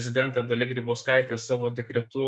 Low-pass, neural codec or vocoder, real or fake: 10.8 kHz; codec, 32 kHz, 1.9 kbps, SNAC; fake